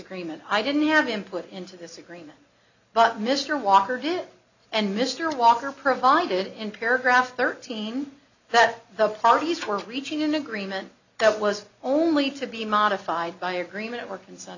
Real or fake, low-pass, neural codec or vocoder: real; 7.2 kHz; none